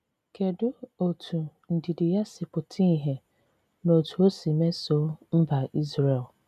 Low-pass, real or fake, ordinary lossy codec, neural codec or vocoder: 14.4 kHz; real; none; none